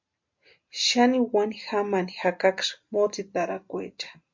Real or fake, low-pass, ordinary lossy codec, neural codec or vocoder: real; 7.2 kHz; MP3, 48 kbps; none